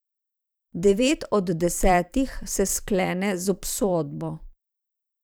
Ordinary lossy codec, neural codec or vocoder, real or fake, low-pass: none; vocoder, 44.1 kHz, 128 mel bands every 512 samples, BigVGAN v2; fake; none